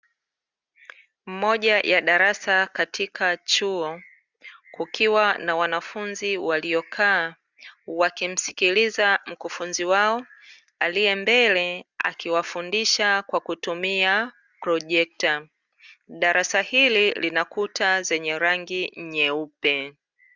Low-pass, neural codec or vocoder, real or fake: 7.2 kHz; none; real